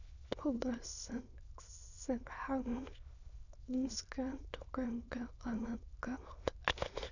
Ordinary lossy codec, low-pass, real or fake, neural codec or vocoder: none; 7.2 kHz; fake; autoencoder, 22.05 kHz, a latent of 192 numbers a frame, VITS, trained on many speakers